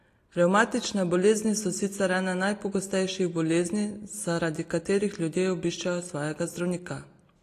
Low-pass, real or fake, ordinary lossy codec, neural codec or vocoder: 14.4 kHz; real; AAC, 48 kbps; none